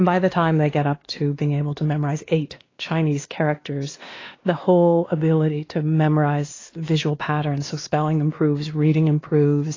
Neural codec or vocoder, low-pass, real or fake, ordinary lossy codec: codec, 16 kHz, 2 kbps, X-Codec, WavLM features, trained on Multilingual LibriSpeech; 7.2 kHz; fake; AAC, 32 kbps